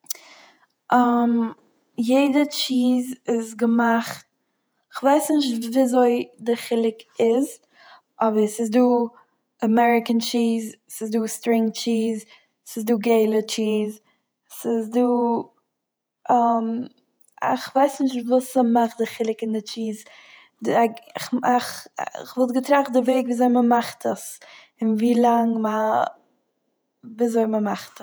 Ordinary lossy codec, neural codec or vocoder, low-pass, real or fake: none; vocoder, 48 kHz, 128 mel bands, Vocos; none; fake